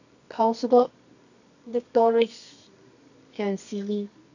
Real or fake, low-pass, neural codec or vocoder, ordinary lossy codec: fake; 7.2 kHz; codec, 24 kHz, 0.9 kbps, WavTokenizer, medium music audio release; none